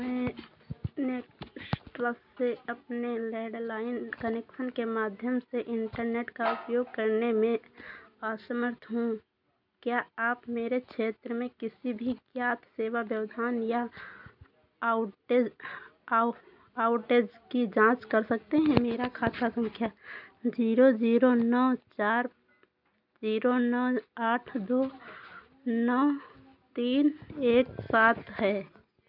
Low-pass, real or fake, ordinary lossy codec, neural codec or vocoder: 5.4 kHz; real; none; none